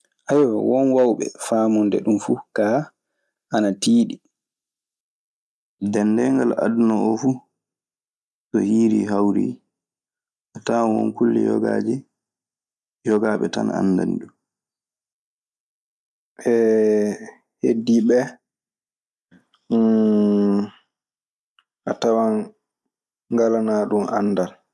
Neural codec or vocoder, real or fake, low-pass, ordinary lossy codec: none; real; none; none